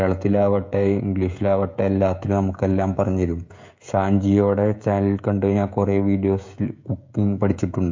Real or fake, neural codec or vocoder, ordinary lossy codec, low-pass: fake; codec, 16 kHz, 16 kbps, FreqCodec, smaller model; MP3, 48 kbps; 7.2 kHz